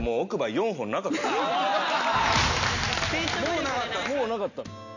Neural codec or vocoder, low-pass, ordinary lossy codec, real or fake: none; 7.2 kHz; none; real